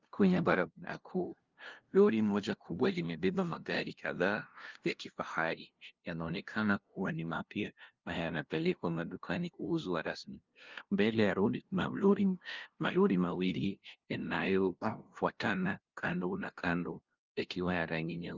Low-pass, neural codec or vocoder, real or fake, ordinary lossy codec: 7.2 kHz; codec, 16 kHz, 0.5 kbps, FunCodec, trained on LibriTTS, 25 frames a second; fake; Opus, 24 kbps